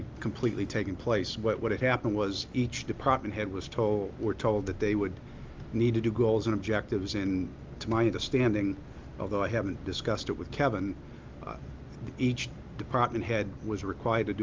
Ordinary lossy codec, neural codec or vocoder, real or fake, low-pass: Opus, 32 kbps; none; real; 7.2 kHz